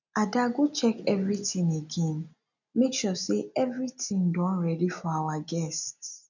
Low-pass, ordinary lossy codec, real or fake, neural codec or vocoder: 7.2 kHz; none; real; none